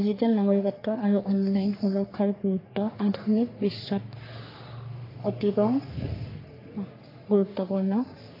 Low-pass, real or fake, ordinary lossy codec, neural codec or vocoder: 5.4 kHz; fake; AAC, 32 kbps; codec, 44.1 kHz, 3.4 kbps, Pupu-Codec